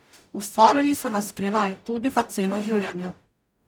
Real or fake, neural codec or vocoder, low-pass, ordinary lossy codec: fake; codec, 44.1 kHz, 0.9 kbps, DAC; none; none